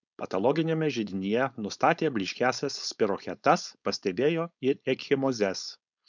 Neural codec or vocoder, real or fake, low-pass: codec, 16 kHz, 4.8 kbps, FACodec; fake; 7.2 kHz